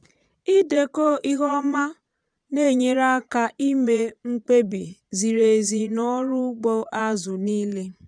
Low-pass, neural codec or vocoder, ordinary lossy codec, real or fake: 9.9 kHz; vocoder, 22.05 kHz, 80 mel bands, Vocos; none; fake